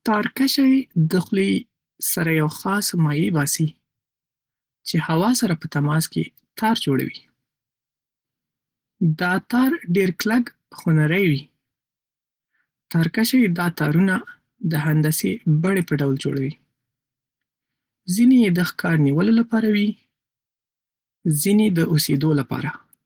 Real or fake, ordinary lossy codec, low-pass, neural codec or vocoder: real; Opus, 16 kbps; 19.8 kHz; none